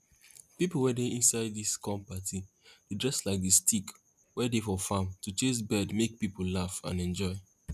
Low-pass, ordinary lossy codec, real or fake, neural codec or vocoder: 14.4 kHz; none; real; none